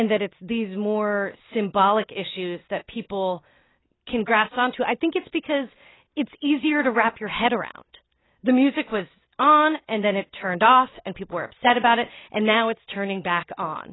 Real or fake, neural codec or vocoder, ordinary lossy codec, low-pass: real; none; AAC, 16 kbps; 7.2 kHz